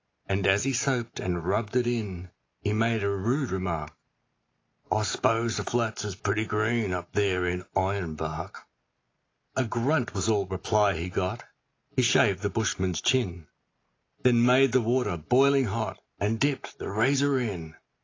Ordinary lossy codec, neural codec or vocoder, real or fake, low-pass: AAC, 32 kbps; none; real; 7.2 kHz